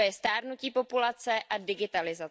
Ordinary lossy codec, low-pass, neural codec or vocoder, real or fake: none; none; none; real